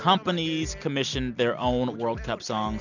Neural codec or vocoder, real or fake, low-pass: none; real; 7.2 kHz